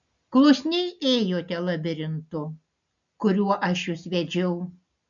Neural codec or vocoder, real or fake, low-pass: none; real; 7.2 kHz